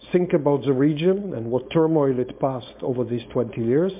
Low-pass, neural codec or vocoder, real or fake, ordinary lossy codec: 3.6 kHz; codec, 16 kHz, 4.8 kbps, FACodec; fake; MP3, 32 kbps